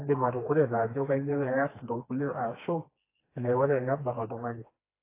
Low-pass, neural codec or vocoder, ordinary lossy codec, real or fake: 3.6 kHz; codec, 16 kHz, 2 kbps, FreqCodec, smaller model; AAC, 24 kbps; fake